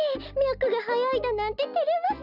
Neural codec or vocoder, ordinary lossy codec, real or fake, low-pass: none; none; real; 5.4 kHz